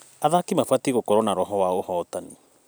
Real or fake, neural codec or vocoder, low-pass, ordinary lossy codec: real; none; none; none